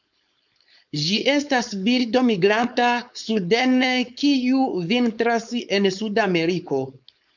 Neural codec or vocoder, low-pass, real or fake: codec, 16 kHz, 4.8 kbps, FACodec; 7.2 kHz; fake